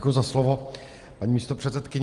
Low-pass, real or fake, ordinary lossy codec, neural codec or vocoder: 10.8 kHz; real; Opus, 24 kbps; none